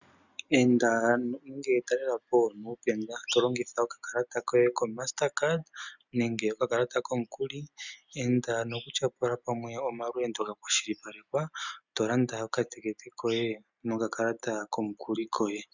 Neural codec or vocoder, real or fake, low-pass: none; real; 7.2 kHz